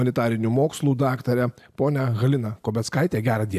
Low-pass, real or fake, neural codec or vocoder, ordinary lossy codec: 14.4 kHz; real; none; AAC, 96 kbps